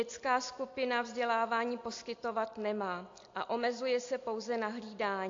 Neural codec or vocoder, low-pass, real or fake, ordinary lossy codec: none; 7.2 kHz; real; AAC, 48 kbps